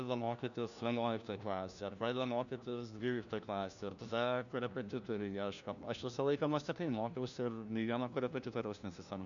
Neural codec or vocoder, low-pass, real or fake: codec, 16 kHz, 1 kbps, FunCodec, trained on LibriTTS, 50 frames a second; 7.2 kHz; fake